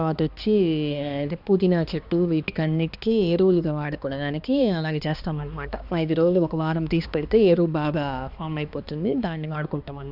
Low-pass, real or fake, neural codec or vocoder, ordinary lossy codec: 5.4 kHz; fake; codec, 16 kHz, 2 kbps, X-Codec, HuBERT features, trained on balanced general audio; none